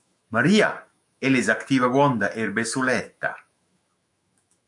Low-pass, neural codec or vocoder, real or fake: 10.8 kHz; autoencoder, 48 kHz, 128 numbers a frame, DAC-VAE, trained on Japanese speech; fake